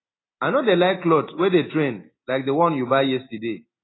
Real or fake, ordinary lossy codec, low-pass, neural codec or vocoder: real; AAC, 16 kbps; 7.2 kHz; none